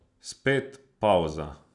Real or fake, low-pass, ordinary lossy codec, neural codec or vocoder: real; 10.8 kHz; none; none